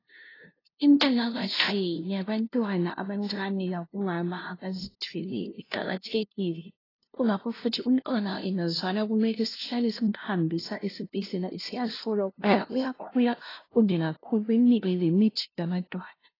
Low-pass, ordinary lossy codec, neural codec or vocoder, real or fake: 5.4 kHz; AAC, 24 kbps; codec, 16 kHz, 0.5 kbps, FunCodec, trained on LibriTTS, 25 frames a second; fake